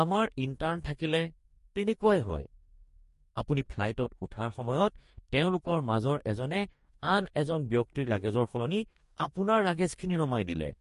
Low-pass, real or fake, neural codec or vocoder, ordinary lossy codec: 14.4 kHz; fake; codec, 44.1 kHz, 2.6 kbps, DAC; MP3, 48 kbps